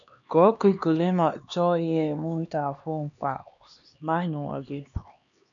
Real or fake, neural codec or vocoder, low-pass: fake; codec, 16 kHz, 2 kbps, X-Codec, HuBERT features, trained on LibriSpeech; 7.2 kHz